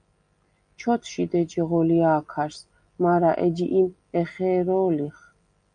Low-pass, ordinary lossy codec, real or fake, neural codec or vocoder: 9.9 kHz; MP3, 96 kbps; real; none